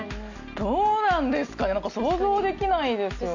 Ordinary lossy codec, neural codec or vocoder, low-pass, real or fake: none; none; 7.2 kHz; real